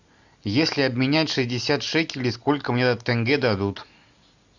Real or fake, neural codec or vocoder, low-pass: real; none; 7.2 kHz